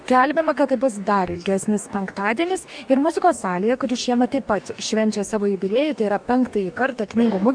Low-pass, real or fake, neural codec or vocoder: 9.9 kHz; fake; codec, 44.1 kHz, 2.6 kbps, DAC